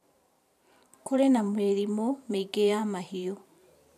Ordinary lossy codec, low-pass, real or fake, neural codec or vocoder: none; 14.4 kHz; fake; vocoder, 44.1 kHz, 128 mel bands every 512 samples, BigVGAN v2